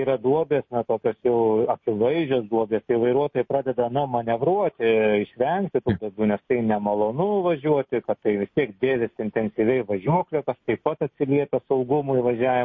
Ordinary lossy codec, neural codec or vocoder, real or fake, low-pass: MP3, 32 kbps; none; real; 7.2 kHz